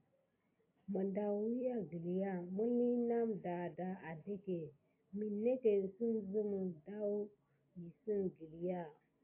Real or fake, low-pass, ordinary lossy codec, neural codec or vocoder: real; 3.6 kHz; AAC, 32 kbps; none